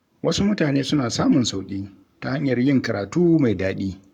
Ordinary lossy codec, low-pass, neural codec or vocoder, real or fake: none; 19.8 kHz; codec, 44.1 kHz, 7.8 kbps, Pupu-Codec; fake